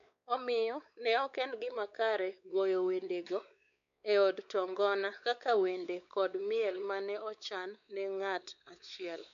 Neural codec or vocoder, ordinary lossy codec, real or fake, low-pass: codec, 16 kHz, 4 kbps, X-Codec, WavLM features, trained on Multilingual LibriSpeech; none; fake; 7.2 kHz